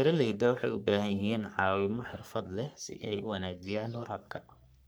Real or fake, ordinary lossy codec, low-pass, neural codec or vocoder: fake; none; none; codec, 44.1 kHz, 3.4 kbps, Pupu-Codec